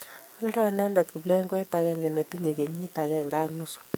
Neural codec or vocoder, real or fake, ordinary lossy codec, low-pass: codec, 44.1 kHz, 2.6 kbps, SNAC; fake; none; none